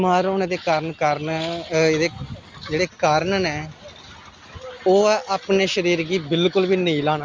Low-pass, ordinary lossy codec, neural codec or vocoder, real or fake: 7.2 kHz; Opus, 32 kbps; none; real